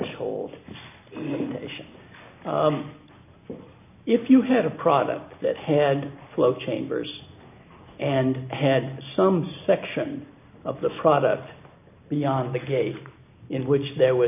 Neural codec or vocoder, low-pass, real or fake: none; 3.6 kHz; real